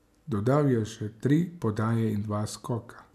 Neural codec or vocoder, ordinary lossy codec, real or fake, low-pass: none; none; real; 14.4 kHz